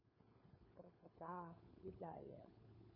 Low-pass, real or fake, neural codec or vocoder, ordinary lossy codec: 5.4 kHz; fake; codec, 16 kHz, 4 kbps, FunCodec, trained on LibriTTS, 50 frames a second; MP3, 24 kbps